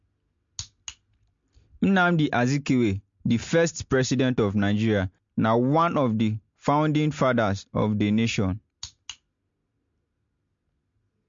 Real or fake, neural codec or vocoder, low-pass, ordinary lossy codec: real; none; 7.2 kHz; MP3, 48 kbps